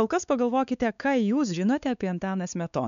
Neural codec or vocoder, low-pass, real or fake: codec, 16 kHz, 4 kbps, X-Codec, WavLM features, trained on Multilingual LibriSpeech; 7.2 kHz; fake